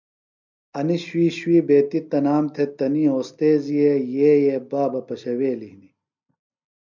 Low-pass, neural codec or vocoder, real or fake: 7.2 kHz; none; real